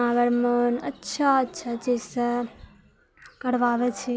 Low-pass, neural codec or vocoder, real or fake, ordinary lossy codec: none; none; real; none